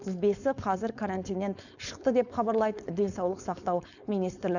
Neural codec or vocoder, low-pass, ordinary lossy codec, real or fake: codec, 16 kHz, 4.8 kbps, FACodec; 7.2 kHz; none; fake